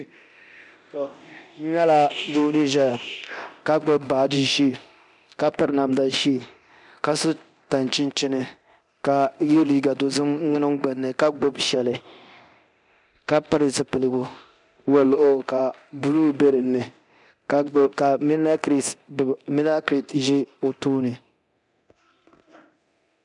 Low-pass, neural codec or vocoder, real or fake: 10.8 kHz; codec, 24 kHz, 0.9 kbps, DualCodec; fake